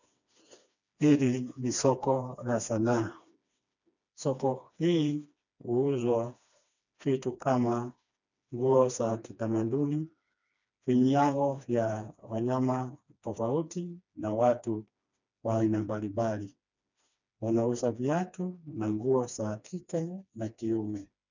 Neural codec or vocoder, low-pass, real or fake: codec, 16 kHz, 2 kbps, FreqCodec, smaller model; 7.2 kHz; fake